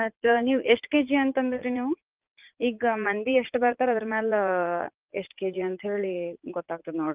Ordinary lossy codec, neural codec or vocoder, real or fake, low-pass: Opus, 24 kbps; vocoder, 44.1 kHz, 80 mel bands, Vocos; fake; 3.6 kHz